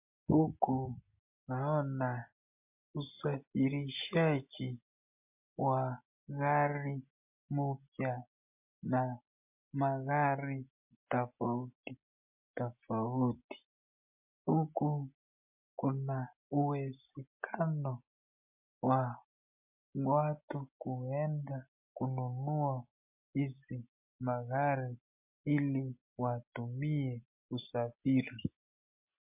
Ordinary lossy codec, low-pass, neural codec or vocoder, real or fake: MP3, 32 kbps; 3.6 kHz; none; real